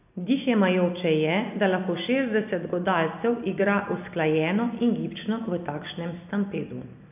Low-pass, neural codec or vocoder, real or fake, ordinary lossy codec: 3.6 kHz; none; real; none